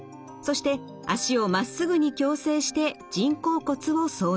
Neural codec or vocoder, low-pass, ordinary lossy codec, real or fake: none; none; none; real